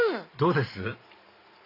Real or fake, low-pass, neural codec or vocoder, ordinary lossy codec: fake; 5.4 kHz; vocoder, 44.1 kHz, 128 mel bands every 512 samples, BigVGAN v2; AAC, 24 kbps